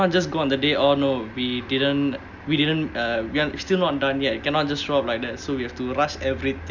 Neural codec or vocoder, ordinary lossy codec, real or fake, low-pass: none; none; real; 7.2 kHz